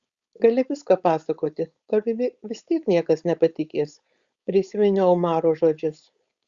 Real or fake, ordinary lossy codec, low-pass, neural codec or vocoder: fake; Opus, 64 kbps; 7.2 kHz; codec, 16 kHz, 4.8 kbps, FACodec